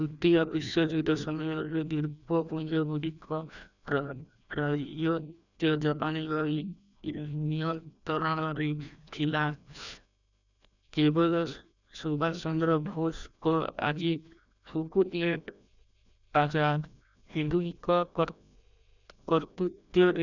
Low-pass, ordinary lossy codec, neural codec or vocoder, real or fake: 7.2 kHz; none; codec, 16 kHz, 1 kbps, FreqCodec, larger model; fake